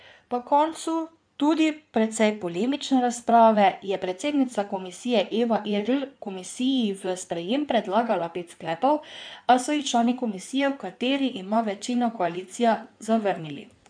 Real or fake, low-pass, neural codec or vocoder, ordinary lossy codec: fake; 9.9 kHz; codec, 16 kHz in and 24 kHz out, 2.2 kbps, FireRedTTS-2 codec; none